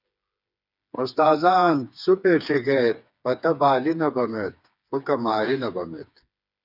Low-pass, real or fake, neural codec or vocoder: 5.4 kHz; fake; codec, 16 kHz, 4 kbps, FreqCodec, smaller model